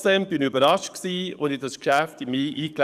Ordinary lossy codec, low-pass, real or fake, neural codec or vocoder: none; 14.4 kHz; fake; codec, 44.1 kHz, 7.8 kbps, DAC